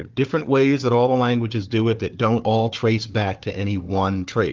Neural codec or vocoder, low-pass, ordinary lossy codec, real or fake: codec, 16 kHz, 4 kbps, FunCodec, trained on Chinese and English, 50 frames a second; 7.2 kHz; Opus, 24 kbps; fake